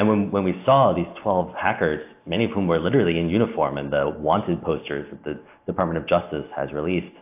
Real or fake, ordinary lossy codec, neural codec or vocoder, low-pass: real; AAC, 32 kbps; none; 3.6 kHz